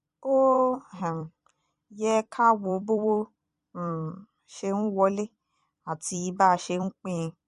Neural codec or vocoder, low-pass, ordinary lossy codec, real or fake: vocoder, 44.1 kHz, 128 mel bands every 256 samples, BigVGAN v2; 14.4 kHz; MP3, 48 kbps; fake